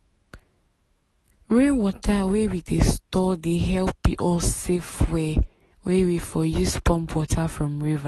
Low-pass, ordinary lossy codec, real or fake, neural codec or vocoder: 19.8 kHz; AAC, 32 kbps; fake; autoencoder, 48 kHz, 128 numbers a frame, DAC-VAE, trained on Japanese speech